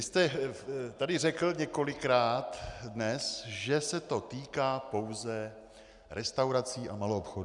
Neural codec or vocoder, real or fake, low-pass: none; real; 10.8 kHz